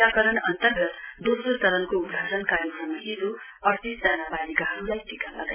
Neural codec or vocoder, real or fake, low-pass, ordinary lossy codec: none; real; 3.6 kHz; none